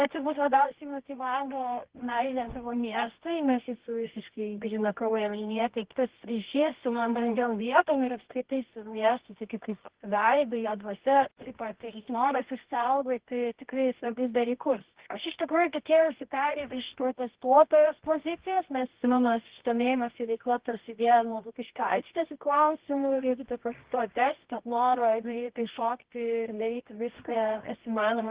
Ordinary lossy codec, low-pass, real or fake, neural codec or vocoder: Opus, 16 kbps; 3.6 kHz; fake; codec, 24 kHz, 0.9 kbps, WavTokenizer, medium music audio release